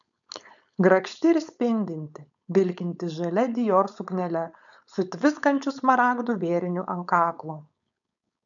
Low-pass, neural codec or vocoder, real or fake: 7.2 kHz; codec, 16 kHz, 4.8 kbps, FACodec; fake